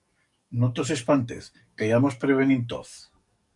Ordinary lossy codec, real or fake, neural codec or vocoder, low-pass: MP3, 64 kbps; fake; codec, 44.1 kHz, 7.8 kbps, DAC; 10.8 kHz